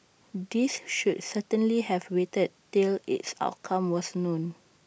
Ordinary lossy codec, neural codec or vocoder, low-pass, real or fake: none; none; none; real